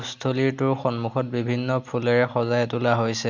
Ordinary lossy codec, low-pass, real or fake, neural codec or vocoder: MP3, 64 kbps; 7.2 kHz; real; none